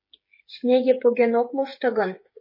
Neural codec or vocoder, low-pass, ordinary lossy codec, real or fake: codec, 16 kHz, 8 kbps, FreqCodec, smaller model; 5.4 kHz; MP3, 24 kbps; fake